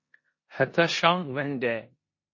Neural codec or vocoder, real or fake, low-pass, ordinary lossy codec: codec, 16 kHz in and 24 kHz out, 0.9 kbps, LongCat-Audio-Codec, four codebook decoder; fake; 7.2 kHz; MP3, 32 kbps